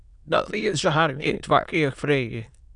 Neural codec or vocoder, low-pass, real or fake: autoencoder, 22.05 kHz, a latent of 192 numbers a frame, VITS, trained on many speakers; 9.9 kHz; fake